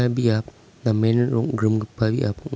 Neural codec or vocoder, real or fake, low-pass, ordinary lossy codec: none; real; none; none